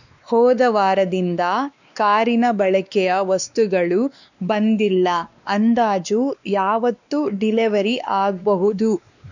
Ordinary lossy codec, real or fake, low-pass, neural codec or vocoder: none; fake; 7.2 kHz; codec, 16 kHz, 2 kbps, X-Codec, WavLM features, trained on Multilingual LibriSpeech